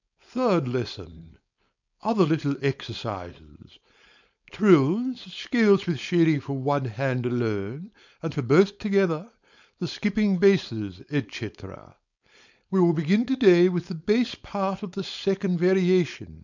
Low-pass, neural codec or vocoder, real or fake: 7.2 kHz; codec, 16 kHz, 4.8 kbps, FACodec; fake